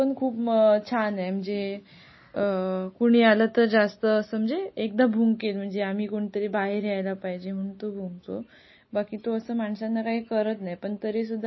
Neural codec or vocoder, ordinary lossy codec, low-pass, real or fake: none; MP3, 24 kbps; 7.2 kHz; real